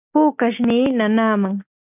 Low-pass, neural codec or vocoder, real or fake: 3.6 kHz; none; real